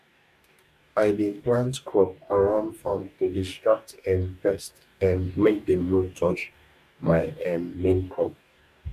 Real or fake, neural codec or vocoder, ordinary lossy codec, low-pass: fake; codec, 44.1 kHz, 2.6 kbps, DAC; none; 14.4 kHz